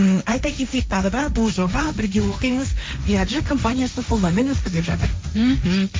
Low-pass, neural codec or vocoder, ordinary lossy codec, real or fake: none; codec, 16 kHz, 1.1 kbps, Voila-Tokenizer; none; fake